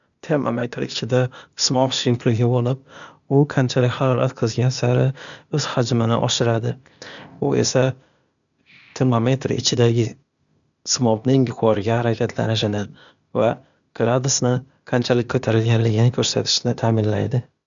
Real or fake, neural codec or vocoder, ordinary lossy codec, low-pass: fake; codec, 16 kHz, 0.8 kbps, ZipCodec; none; 7.2 kHz